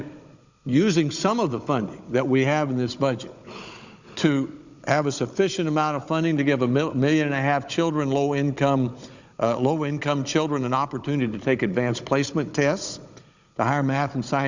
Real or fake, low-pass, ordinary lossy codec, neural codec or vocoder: fake; 7.2 kHz; Opus, 64 kbps; codec, 16 kHz, 16 kbps, FunCodec, trained on Chinese and English, 50 frames a second